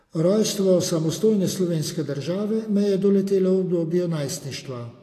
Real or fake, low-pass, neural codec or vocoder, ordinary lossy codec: real; 14.4 kHz; none; AAC, 48 kbps